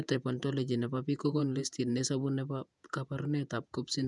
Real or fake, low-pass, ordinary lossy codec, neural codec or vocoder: fake; 10.8 kHz; none; vocoder, 48 kHz, 128 mel bands, Vocos